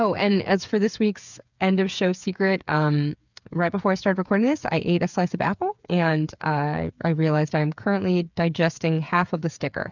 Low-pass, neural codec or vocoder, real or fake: 7.2 kHz; codec, 16 kHz, 8 kbps, FreqCodec, smaller model; fake